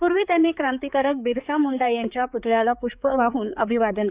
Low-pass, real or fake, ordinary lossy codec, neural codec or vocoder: 3.6 kHz; fake; Opus, 64 kbps; codec, 16 kHz, 4 kbps, X-Codec, HuBERT features, trained on balanced general audio